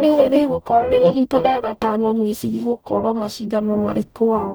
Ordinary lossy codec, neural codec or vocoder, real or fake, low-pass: none; codec, 44.1 kHz, 0.9 kbps, DAC; fake; none